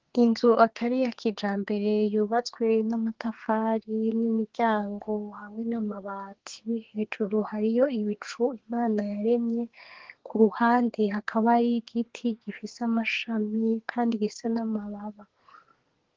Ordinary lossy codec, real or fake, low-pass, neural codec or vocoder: Opus, 16 kbps; fake; 7.2 kHz; codec, 32 kHz, 1.9 kbps, SNAC